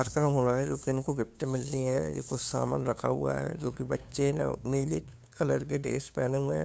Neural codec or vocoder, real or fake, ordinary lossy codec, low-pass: codec, 16 kHz, 2 kbps, FunCodec, trained on LibriTTS, 25 frames a second; fake; none; none